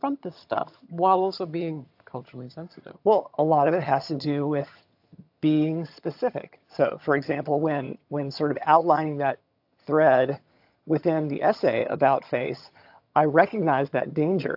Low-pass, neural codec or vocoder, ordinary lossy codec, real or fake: 5.4 kHz; vocoder, 22.05 kHz, 80 mel bands, HiFi-GAN; AAC, 48 kbps; fake